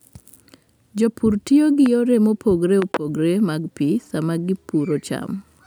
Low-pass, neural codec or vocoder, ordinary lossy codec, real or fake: none; none; none; real